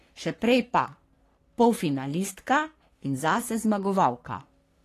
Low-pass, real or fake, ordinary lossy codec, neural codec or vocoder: 14.4 kHz; fake; AAC, 48 kbps; codec, 44.1 kHz, 3.4 kbps, Pupu-Codec